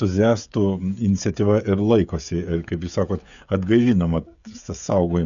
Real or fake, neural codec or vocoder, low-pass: fake; codec, 16 kHz, 16 kbps, FreqCodec, smaller model; 7.2 kHz